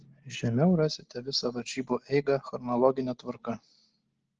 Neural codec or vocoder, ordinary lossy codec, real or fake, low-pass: none; Opus, 16 kbps; real; 7.2 kHz